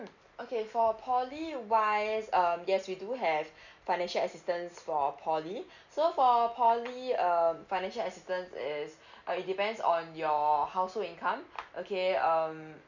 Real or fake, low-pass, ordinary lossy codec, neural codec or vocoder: real; 7.2 kHz; none; none